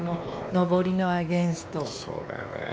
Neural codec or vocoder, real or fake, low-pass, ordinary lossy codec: codec, 16 kHz, 2 kbps, X-Codec, WavLM features, trained on Multilingual LibriSpeech; fake; none; none